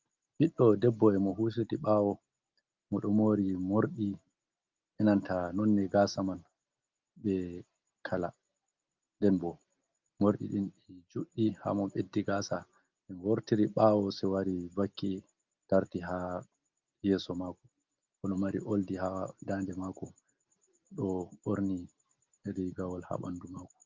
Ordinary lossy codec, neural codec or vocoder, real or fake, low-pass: Opus, 32 kbps; none; real; 7.2 kHz